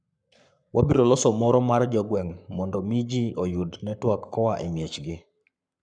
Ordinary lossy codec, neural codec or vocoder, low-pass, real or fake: none; codec, 44.1 kHz, 7.8 kbps, Pupu-Codec; 9.9 kHz; fake